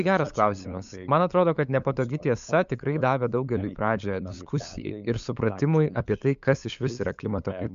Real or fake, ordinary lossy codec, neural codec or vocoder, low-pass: fake; MP3, 64 kbps; codec, 16 kHz, 8 kbps, FunCodec, trained on LibriTTS, 25 frames a second; 7.2 kHz